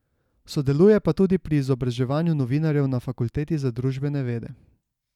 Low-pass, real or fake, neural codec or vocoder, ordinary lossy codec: 19.8 kHz; real; none; none